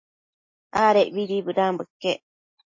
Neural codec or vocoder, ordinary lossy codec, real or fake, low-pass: none; MP3, 32 kbps; real; 7.2 kHz